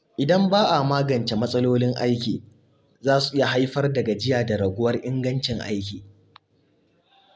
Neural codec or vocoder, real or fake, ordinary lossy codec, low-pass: none; real; none; none